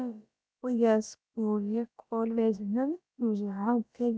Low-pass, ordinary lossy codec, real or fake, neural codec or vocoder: none; none; fake; codec, 16 kHz, about 1 kbps, DyCAST, with the encoder's durations